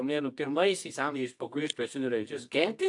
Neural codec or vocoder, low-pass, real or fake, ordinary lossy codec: codec, 24 kHz, 0.9 kbps, WavTokenizer, medium music audio release; 10.8 kHz; fake; AAC, 64 kbps